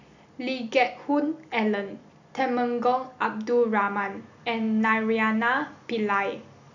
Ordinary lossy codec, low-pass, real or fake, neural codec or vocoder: none; 7.2 kHz; real; none